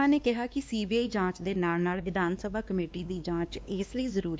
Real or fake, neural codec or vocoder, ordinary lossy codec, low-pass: fake; codec, 16 kHz, 2 kbps, X-Codec, WavLM features, trained on Multilingual LibriSpeech; none; none